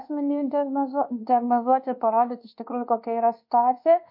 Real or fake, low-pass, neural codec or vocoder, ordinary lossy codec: fake; 5.4 kHz; codec, 24 kHz, 1.2 kbps, DualCodec; MP3, 32 kbps